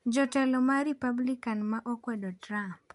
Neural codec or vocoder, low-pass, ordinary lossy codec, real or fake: none; 10.8 kHz; MP3, 64 kbps; real